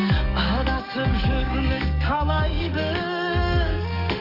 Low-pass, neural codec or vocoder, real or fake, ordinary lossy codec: 5.4 kHz; codec, 44.1 kHz, 7.8 kbps, DAC; fake; none